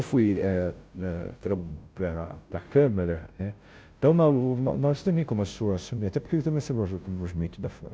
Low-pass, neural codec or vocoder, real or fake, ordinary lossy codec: none; codec, 16 kHz, 0.5 kbps, FunCodec, trained on Chinese and English, 25 frames a second; fake; none